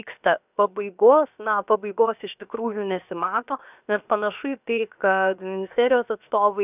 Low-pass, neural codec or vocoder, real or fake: 3.6 kHz; codec, 16 kHz, 0.7 kbps, FocalCodec; fake